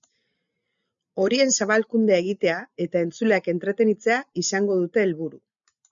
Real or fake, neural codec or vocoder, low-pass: real; none; 7.2 kHz